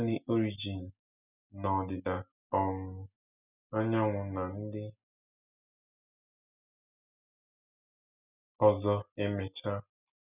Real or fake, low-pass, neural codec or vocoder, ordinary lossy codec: real; 3.6 kHz; none; none